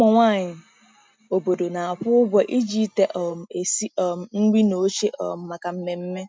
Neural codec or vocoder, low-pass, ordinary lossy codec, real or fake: none; none; none; real